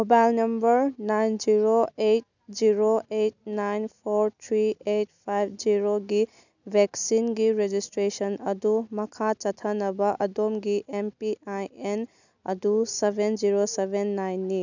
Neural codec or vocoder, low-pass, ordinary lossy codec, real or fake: none; 7.2 kHz; none; real